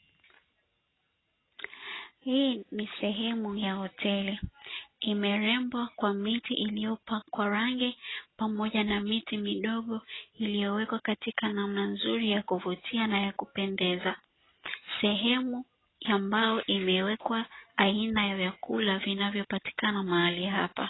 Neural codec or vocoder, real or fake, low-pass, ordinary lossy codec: none; real; 7.2 kHz; AAC, 16 kbps